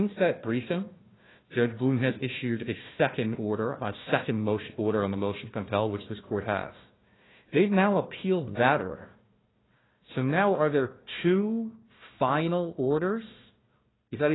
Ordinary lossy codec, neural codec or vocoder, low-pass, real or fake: AAC, 16 kbps; codec, 16 kHz, 1 kbps, FunCodec, trained on Chinese and English, 50 frames a second; 7.2 kHz; fake